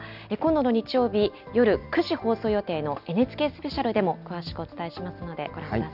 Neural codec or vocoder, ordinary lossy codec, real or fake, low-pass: none; none; real; 5.4 kHz